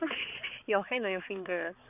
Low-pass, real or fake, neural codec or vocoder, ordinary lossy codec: 3.6 kHz; fake; codec, 16 kHz, 4 kbps, X-Codec, HuBERT features, trained on balanced general audio; none